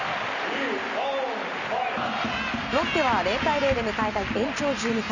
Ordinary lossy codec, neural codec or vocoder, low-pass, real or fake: none; none; 7.2 kHz; real